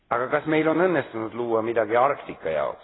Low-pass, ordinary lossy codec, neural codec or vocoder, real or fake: 7.2 kHz; AAC, 16 kbps; none; real